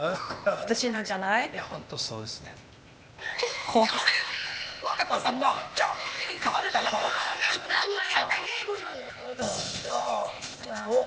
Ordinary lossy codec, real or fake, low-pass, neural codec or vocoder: none; fake; none; codec, 16 kHz, 0.8 kbps, ZipCodec